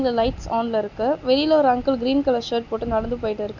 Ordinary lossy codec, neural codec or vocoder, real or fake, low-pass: none; none; real; 7.2 kHz